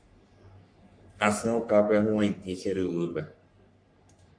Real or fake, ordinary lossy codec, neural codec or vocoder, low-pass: fake; AAC, 64 kbps; codec, 44.1 kHz, 3.4 kbps, Pupu-Codec; 9.9 kHz